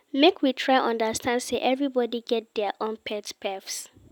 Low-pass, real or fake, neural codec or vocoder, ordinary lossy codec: 19.8 kHz; real; none; none